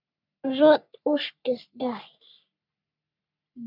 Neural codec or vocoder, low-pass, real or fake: codec, 44.1 kHz, 3.4 kbps, Pupu-Codec; 5.4 kHz; fake